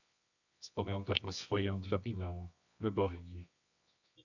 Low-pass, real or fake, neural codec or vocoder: 7.2 kHz; fake; codec, 24 kHz, 0.9 kbps, WavTokenizer, medium music audio release